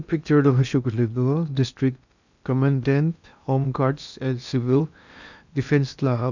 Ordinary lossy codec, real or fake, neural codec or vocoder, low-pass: none; fake; codec, 16 kHz in and 24 kHz out, 0.8 kbps, FocalCodec, streaming, 65536 codes; 7.2 kHz